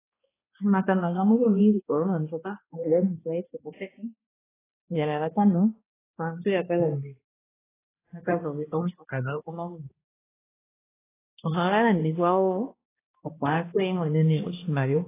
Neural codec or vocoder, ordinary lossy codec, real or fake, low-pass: codec, 16 kHz, 1 kbps, X-Codec, HuBERT features, trained on balanced general audio; AAC, 16 kbps; fake; 3.6 kHz